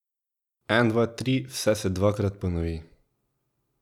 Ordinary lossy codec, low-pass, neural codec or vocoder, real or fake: none; 19.8 kHz; none; real